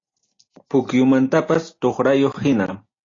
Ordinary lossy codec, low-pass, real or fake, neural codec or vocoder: AAC, 32 kbps; 7.2 kHz; real; none